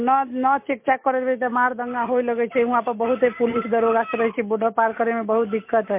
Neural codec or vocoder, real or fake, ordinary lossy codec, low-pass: none; real; MP3, 24 kbps; 3.6 kHz